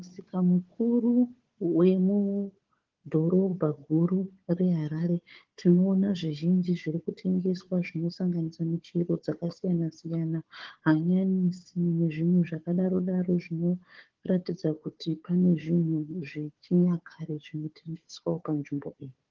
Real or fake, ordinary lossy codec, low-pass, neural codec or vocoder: fake; Opus, 16 kbps; 7.2 kHz; codec, 16 kHz, 4 kbps, FunCodec, trained on Chinese and English, 50 frames a second